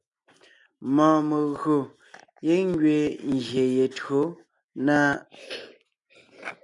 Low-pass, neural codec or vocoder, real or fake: 10.8 kHz; none; real